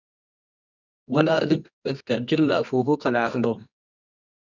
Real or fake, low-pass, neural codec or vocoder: fake; 7.2 kHz; codec, 24 kHz, 0.9 kbps, WavTokenizer, medium music audio release